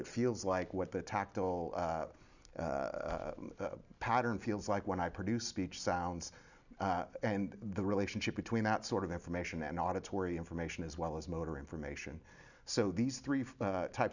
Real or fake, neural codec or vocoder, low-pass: real; none; 7.2 kHz